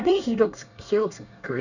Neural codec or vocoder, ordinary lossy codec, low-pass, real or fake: codec, 24 kHz, 1 kbps, SNAC; none; 7.2 kHz; fake